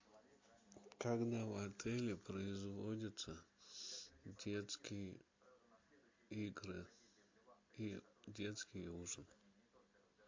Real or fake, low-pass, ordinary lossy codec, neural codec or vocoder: real; 7.2 kHz; MP3, 48 kbps; none